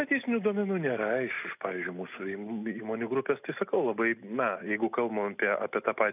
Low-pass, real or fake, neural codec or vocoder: 3.6 kHz; real; none